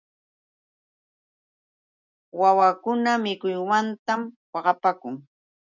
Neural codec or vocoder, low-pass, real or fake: none; 7.2 kHz; real